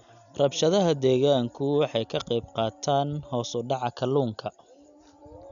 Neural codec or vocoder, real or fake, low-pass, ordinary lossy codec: none; real; 7.2 kHz; none